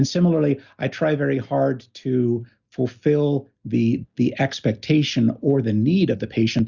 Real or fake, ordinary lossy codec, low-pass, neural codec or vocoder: real; Opus, 64 kbps; 7.2 kHz; none